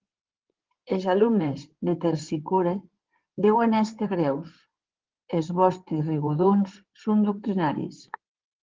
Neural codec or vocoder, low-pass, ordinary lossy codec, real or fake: codec, 16 kHz in and 24 kHz out, 2.2 kbps, FireRedTTS-2 codec; 7.2 kHz; Opus, 16 kbps; fake